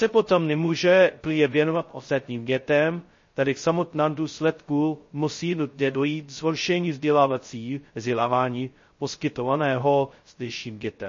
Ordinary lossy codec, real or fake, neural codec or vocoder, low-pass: MP3, 32 kbps; fake; codec, 16 kHz, 0.2 kbps, FocalCodec; 7.2 kHz